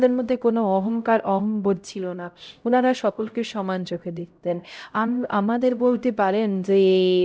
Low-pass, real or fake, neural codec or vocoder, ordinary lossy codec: none; fake; codec, 16 kHz, 0.5 kbps, X-Codec, HuBERT features, trained on LibriSpeech; none